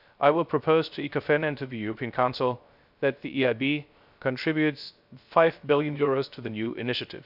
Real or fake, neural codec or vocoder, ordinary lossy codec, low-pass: fake; codec, 16 kHz, 0.3 kbps, FocalCodec; none; 5.4 kHz